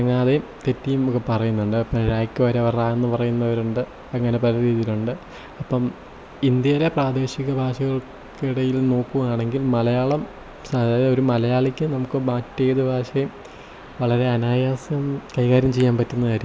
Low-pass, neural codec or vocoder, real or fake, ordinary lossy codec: none; none; real; none